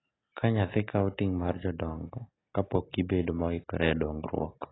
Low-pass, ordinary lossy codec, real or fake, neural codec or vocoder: 7.2 kHz; AAC, 16 kbps; real; none